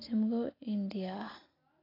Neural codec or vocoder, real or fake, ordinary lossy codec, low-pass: none; real; AAC, 24 kbps; 5.4 kHz